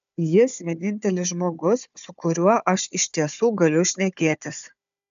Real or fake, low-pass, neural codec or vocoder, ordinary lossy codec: fake; 7.2 kHz; codec, 16 kHz, 4 kbps, FunCodec, trained on Chinese and English, 50 frames a second; AAC, 96 kbps